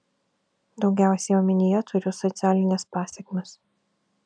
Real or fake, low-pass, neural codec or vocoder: real; 9.9 kHz; none